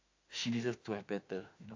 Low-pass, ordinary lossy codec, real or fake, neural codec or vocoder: 7.2 kHz; AAC, 48 kbps; fake; autoencoder, 48 kHz, 32 numbers a frame, DAC-VAE, trained on Japanese speech